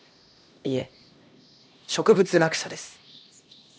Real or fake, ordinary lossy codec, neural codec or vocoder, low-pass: fake; none; codec, 16 kHz, 1 kbps, X-Codec, HuBERT features, trained on LibriSpeech; none